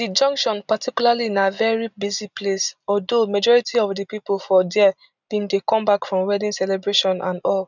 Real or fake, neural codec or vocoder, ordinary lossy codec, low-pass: real; none; none; 7.2 kHz